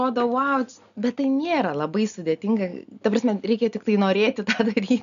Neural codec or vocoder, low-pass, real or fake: none; 7.2 kHz; real